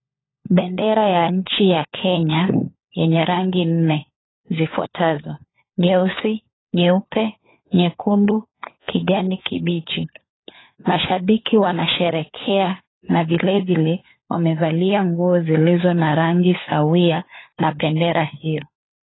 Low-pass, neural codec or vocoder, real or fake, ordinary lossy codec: 7.2 kHz; codec, 16 kHz, 4 kbps, FunCodec, trained on LibriTTS, 50 frames a second; fake; AAC, 16 kbps